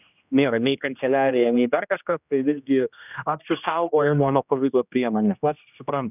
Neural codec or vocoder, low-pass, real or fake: codec, 16 kHz, 1 kbps, X-Codec, HuBERT features, trained on general audio; 3.6 kHz; fake